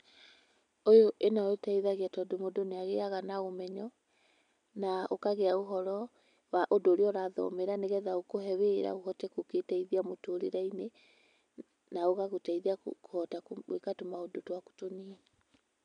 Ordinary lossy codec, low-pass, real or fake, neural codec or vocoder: none; 9.9 kHz; real; none